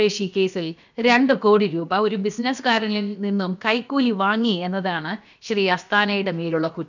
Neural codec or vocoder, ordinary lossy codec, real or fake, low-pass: codec, 16 kHz, about 1 kbps, DyCAST, with the encoder's durations; none; fake; 7.2 kHz